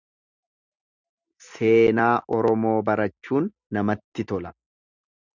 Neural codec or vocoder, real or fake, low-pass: none; real; 7.2 kHz